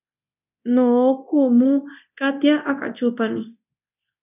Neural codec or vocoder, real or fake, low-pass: codec, 24 kHz, 0.9 kbps, DualCodec; fake; 3.6 kHz